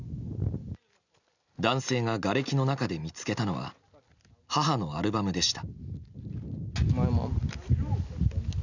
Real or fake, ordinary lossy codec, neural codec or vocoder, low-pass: real; none; none; 7.2 kHz